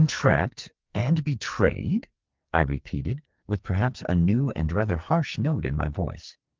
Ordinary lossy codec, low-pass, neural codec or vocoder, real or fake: Opus, 32 kbps; 7.2 kHz; codec, 44.1 kHz, 2.6 kbps, SNAC; fake